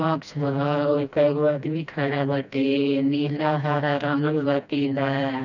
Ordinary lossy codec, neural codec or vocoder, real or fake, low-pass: none; codec, 16 kHz, 1 kbps, FreqCodec, smaller model; fake; 7.2 kHz